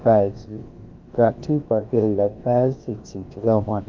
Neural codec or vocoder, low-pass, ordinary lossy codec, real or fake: codec, 16 kHz, about 1 kbps, DyCAST, with the encoder's durations; 7.2 kHz; Opus, 32 kbps; fake